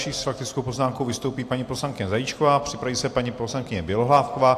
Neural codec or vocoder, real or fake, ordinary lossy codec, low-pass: none; real; AAC, 64 kbps; 14.4 kHz